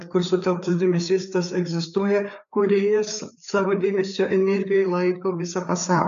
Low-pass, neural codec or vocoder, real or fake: 7.2 kHz; codec, 16 kHz, 4 kbps, FreqCodec, larger model; fake